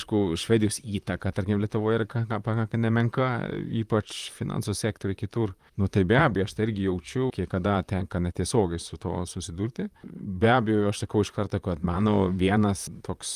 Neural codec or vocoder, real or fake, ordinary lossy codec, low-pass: vocoder, 44.1 kHz, 128 mel bands, Pupu-Vocoder; fake; Opus, 32 kbps; 14.4 kHz